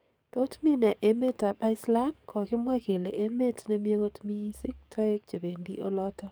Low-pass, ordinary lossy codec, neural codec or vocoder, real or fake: none; none; codec, 44.1 kHz, 7.8 kbps, DAC; fake